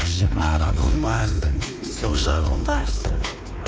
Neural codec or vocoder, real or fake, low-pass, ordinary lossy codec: codec, 16 kHz, 2 kbps, X-Codec, WavLM features, trained on Multilingual LibriSpeech; fake; none; none